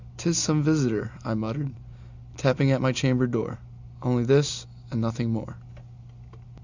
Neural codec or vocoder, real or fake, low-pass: none; real; 7.2 kHz